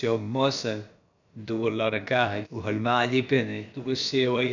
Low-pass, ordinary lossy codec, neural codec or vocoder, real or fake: 7.2 kHz; none; codec, 16 kHz, about 1 kbps, DyCAST, with the encoder's durations; fake